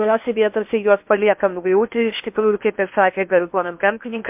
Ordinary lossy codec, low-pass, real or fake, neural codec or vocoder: MP3, 32 kbps; 3.6 kHz; fake; codec, 16 kHz in and 24 kHz out, 0.8 kbps, FocalCodec, streaming, 65536 codes